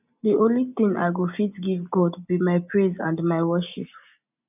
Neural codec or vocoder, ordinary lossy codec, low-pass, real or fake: none; none; 3.6 kHz; real